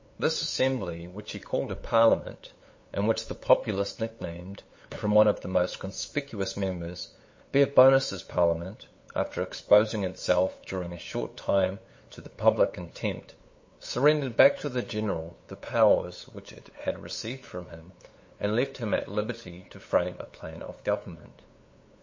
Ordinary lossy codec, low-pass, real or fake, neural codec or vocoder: MP3, 32 kbps; 7.2 kHz; fake; codec, 16 kHz, 8 kbps, FunCodec, trained on LibriTTS, 25 frames a second